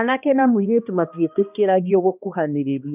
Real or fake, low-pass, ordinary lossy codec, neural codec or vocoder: fake; 3.6 kHz; none; codec, 16 kHz, 1 kbps, X-Codec, HuBERT features, trained on balanced general audio